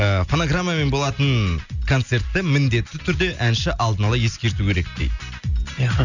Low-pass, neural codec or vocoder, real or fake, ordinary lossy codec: 7.2 kHz; none; real; none